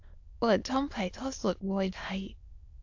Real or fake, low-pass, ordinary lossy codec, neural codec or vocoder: fake; 7.2 kHz; AAC, 48 kbps; autoencoder, 22.05 kHz, a latent of 192 numbers a frame, VITS, trained on many speakers